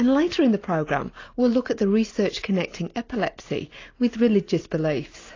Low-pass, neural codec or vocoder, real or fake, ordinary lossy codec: 7.2 kHz; none; real; AAC, 32 kbps